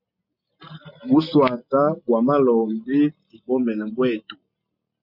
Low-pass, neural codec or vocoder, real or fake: 5.4 kHz; none; real